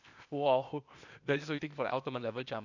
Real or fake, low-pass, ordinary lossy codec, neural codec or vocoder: fake; 7.2 kHz; none; codec, 16 kHz, 0.8 kbps, ZipCodec